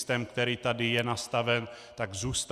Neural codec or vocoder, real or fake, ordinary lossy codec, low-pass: none; real; Opus, 64 kbps; 14.4 kHz